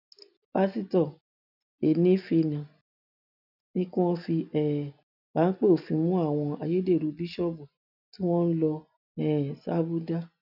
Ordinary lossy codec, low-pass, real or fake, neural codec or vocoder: none; 5.4 kHz; real; none